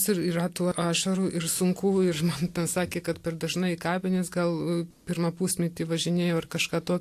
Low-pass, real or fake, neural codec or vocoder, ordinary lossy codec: 14.4 kHz; real; none; AAC, 64 kbps